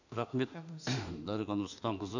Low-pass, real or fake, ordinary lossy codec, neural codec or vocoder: 7.2 kHz; fake; none; autoencoder, 48 kHz, 32 numbers a frame, DAC-VAE, trained on Japanese speech